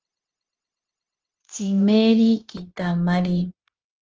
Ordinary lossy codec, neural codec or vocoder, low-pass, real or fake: Opus, 16 kbps; codec, 16 kHz, 0.9 kbps, LongCat-Audio-Codec; 7.2 kHz; fake